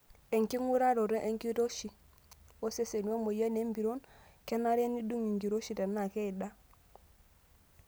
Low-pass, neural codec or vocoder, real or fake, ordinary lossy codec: none; none; real; none